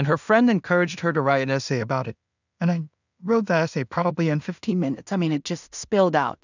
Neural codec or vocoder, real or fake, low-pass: codec, 16 kHz in and 24 kHz out, 0.4 kbps, LongCat-Audio-Codec, two codebook decoder; fake; 7.2 kHz